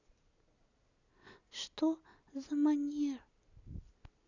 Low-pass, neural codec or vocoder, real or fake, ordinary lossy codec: 7.2 kHz; none; real; none